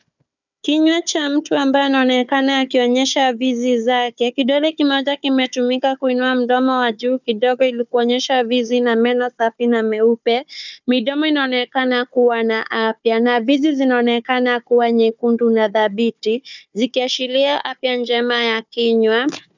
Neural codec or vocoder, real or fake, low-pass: codec, 16 kHz, 4 kbps, FunCodec, trained on Chinese and English, 50 frames a second; fake; 7.2 kHz